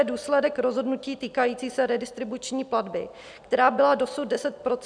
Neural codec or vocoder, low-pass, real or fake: none; 9.9 kHz; real